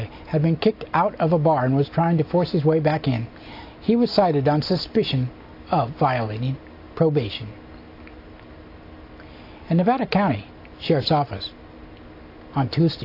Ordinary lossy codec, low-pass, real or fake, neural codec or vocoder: AAC, 32 kbps; 5.4 kHz; real; none